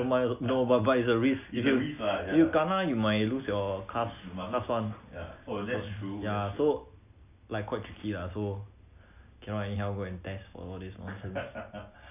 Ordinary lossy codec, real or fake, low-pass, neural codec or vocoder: none; real; 3.6 kHz; none